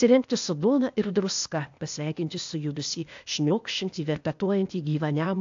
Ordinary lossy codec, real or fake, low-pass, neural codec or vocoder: AAC, 64 kbps; fake; 7.2 kHz; codec, 16 kHz, 0.8 kbps, ZipCodec